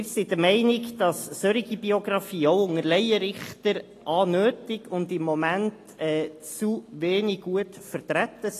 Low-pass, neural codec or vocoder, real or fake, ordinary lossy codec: 14.4 kHz; none; real; AAC, 48 kbps